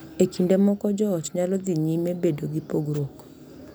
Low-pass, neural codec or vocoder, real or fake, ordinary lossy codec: none; none; real; none